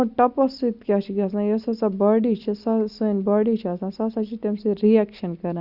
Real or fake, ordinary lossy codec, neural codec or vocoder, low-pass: real; Opus, 64 kbps; none; 5.4 kHz